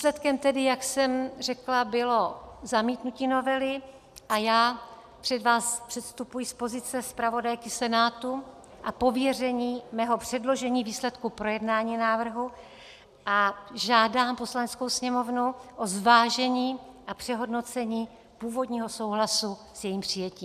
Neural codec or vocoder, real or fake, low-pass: none; real; 14.4 kHz